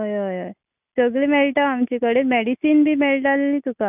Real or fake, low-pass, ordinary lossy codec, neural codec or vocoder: real; 3.6 kHz; none; none